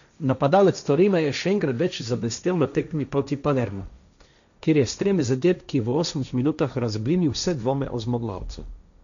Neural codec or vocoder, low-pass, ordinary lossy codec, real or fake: codec, 16 kHz, 1.1 kbps, Voila-Tokenizer; 7.2 kHz; MP3, 96 kbps; fake